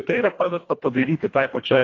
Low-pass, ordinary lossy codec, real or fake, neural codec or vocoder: 7.2 kHz; AAC, 32 kbps; fake; codec, 24 kHz, 1.5 kbps, HILCodec